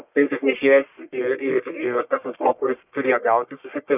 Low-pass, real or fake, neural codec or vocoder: 3.6 kHz; fake; codec, 44.1 kHz, 1.7 kbps, Pupu-Codec